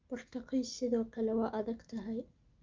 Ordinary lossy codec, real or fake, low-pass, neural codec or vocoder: Opus, 32 kbps; real; 7.2 kHz; none